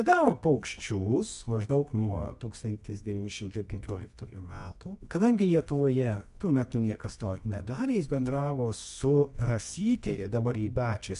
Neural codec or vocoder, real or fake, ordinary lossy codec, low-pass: codec, 24 kHz, 0.9 kbps, WavTokenizer, medium music audio release; fake; MP3, 64 kbps; 10.8 kHz